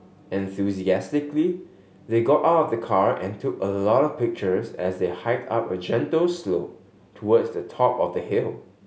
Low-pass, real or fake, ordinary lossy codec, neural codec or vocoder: none; real; none; none